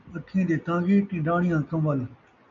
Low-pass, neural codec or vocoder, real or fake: 7.2 kHz; none; real